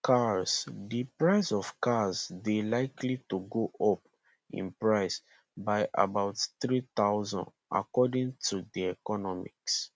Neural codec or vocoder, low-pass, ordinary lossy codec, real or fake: none; none; none; real